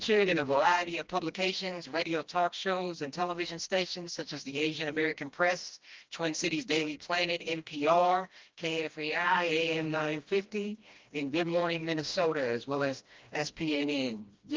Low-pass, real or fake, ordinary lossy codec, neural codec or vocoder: 7.2 kHz; fake; Opus, 24 kbps; codec, 16 kHz, 1 kbps, FreqCodec, smaller model